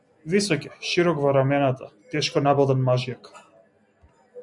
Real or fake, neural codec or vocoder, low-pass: real; none; 10.8 kHz